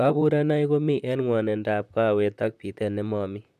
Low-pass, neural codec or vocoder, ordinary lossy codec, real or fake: 14.4 kHz; vocoder, 44.1 kHz, 128 mel bands, Pupu-Vocoder; none; fake